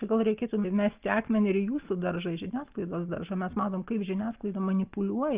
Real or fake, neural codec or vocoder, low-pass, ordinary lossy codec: real; none; 3.6 kHz; Opus, 24 kbps